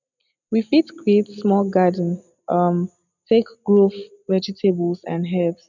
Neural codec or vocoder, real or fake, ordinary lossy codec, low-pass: none; real; none; 7.2 kHz